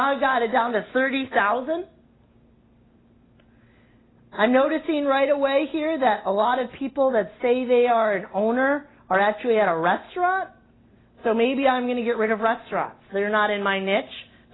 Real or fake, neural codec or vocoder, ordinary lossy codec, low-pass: real; none; AAC, 16 kbps; 7.2 kHz